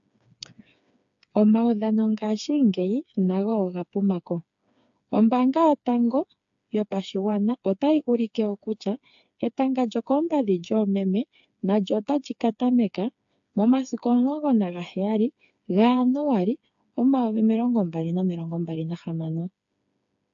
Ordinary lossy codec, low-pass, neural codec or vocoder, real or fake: AAC, 64 kbps; 7.2 kHz; codec, 16 kHz, 4 kbps, FreqCodec, smaller model; fake